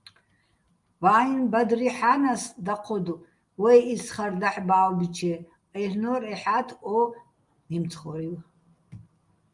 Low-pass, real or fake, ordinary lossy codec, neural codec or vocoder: 10.8 kHz; real; Opus, 32 kbps; none